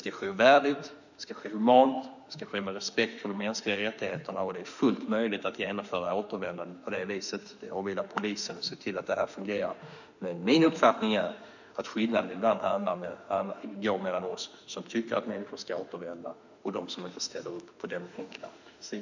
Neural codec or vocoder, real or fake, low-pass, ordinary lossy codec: autoencoder, 48 kHz, 32 numbers a frame, DAC-VAE, trained on Japanese speech; fake; 7.2 kHz; none